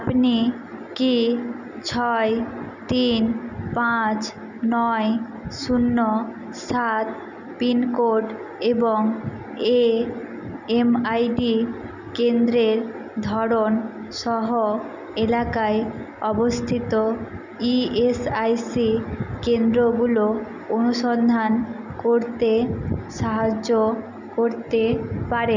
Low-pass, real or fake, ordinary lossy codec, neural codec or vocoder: 7.2 kHz; real; none; none